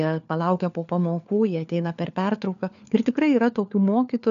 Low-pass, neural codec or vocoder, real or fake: 7.2 kHz; codec, 16 kHz, 4 kbps, FunCodec, trained on LibriTTS, 50 frames a second; fake